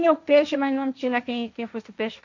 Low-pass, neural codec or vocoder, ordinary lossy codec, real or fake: 7.2 kHz; codec, 16 kHz, 1.1 kbps, Voila-Tokenizer; none; fake